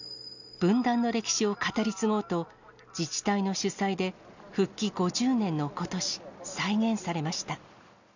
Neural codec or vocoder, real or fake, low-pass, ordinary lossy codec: none; real; 7.2 kHz; none